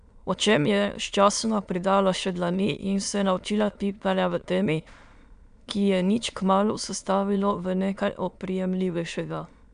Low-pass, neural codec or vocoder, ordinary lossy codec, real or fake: 9.9 kHz; autoencoder, 22.05 kHz, a latent of 192 numbers a frame, VITS, trained on many speakers; none; fake